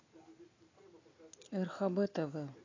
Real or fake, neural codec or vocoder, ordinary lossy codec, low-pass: real; none; AAC, 32 kbps; 7.2 kHz